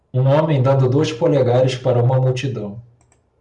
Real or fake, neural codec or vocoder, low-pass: real; none; 10.8 kHz